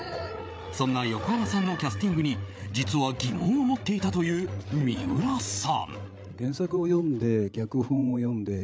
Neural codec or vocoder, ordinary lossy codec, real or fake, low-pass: codec, 16 kHz, 8 kbps, FreqCodec, larger model; none; fake; none